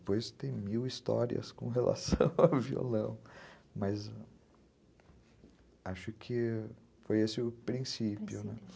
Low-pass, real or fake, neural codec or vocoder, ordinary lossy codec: none; real; none; none